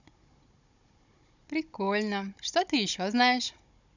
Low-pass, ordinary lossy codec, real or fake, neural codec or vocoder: 7.2 kHz; none; fake; codec, 16 kHz, 16 kbps, FunCodec, trained on Chinese and English, 50 frames a second